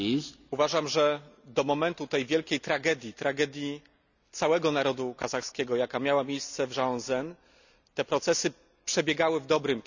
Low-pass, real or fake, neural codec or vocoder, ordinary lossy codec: 7.2 kHz; real; none; none